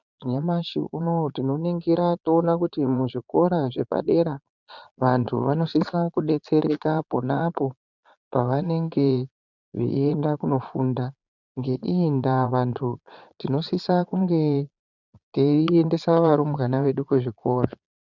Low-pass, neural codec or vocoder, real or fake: 7.2 kHz; vocoder, 22.05 kHz, 80 mel bands, Vocos; fake